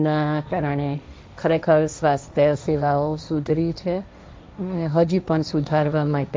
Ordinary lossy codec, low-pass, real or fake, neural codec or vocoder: none; none; fake; codec, 16 kHz, 1.1 kbps, Voila-Tokenizer